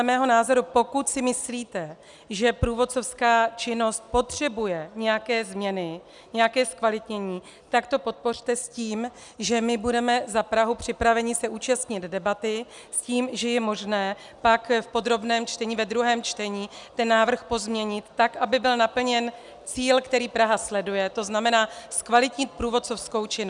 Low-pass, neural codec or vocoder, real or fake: 10.8 kHz; none; real